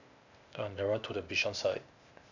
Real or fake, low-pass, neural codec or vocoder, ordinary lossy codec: fake; 7.2 kHz; codec, 16 kHz, 0.8 kbps, ZipCodec; none